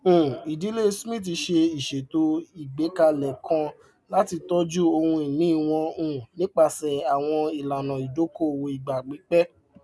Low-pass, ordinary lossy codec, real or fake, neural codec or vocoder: none; none; real; none